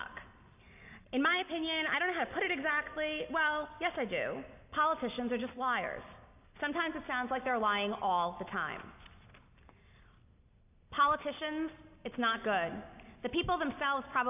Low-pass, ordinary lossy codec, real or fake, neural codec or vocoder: 3.6 kHz; AAC, 32 kbps; real; none